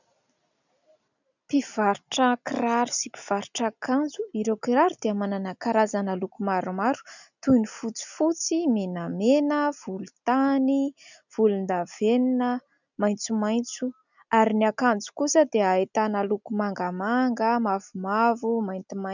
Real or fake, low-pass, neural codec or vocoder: real; 7.2 kHz; none